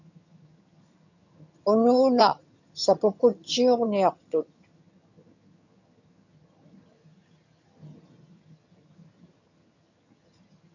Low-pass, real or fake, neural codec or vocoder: 7.2 kHz; fake; vocoder, 22.05 kHz, 80 mel bands, HiFi-GAN